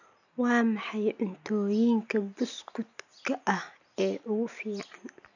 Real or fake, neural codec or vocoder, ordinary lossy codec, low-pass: real; none; none; 7.2 kHz